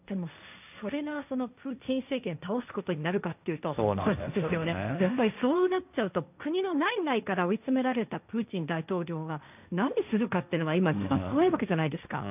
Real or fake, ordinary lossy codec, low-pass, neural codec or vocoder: fake; none; 3.6 kHz; codec, 16 kHz, 1.1 kbps, Voila-Tokenizer